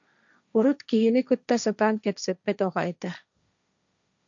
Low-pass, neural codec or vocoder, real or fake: 7.2 kHz; codec, 16 kHz, 1.1 kbps, Voila-Tokenizer; fake